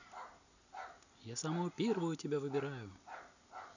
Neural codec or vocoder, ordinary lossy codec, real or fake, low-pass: none; none; real; 7.2 kHz